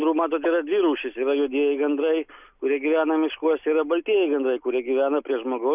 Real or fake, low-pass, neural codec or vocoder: real; 3.6 kHz; none